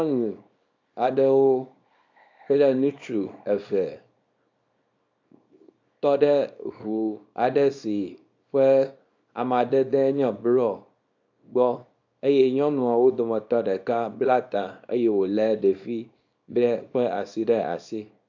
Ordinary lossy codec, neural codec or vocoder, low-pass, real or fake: AAC, 48 kbps; codec, 24 kHz, 0.9 kbps, WavTokenizer, small release; 7.2 kHz; fake